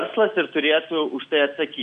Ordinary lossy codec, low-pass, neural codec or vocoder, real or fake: MP3, 96 kbps; 9.9 kHz; none; real